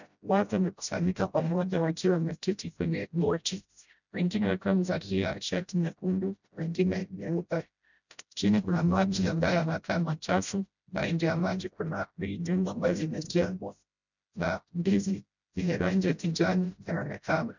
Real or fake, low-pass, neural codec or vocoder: fake; 7.2 kHz; codec, 16 kHz, 0.5 kbps, FreqCodec, smaller model